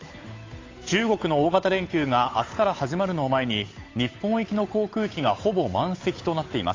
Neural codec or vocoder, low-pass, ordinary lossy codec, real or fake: codec, 16 kHz, 8 kbps, FunCodec, trained on Chinese and English, 25 frames a second; 7.2 kHz; AAC, 32 kbps; fake